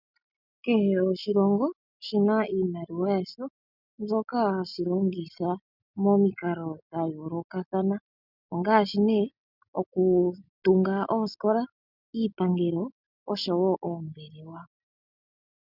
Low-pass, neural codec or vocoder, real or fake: 5.4 kHz; none; real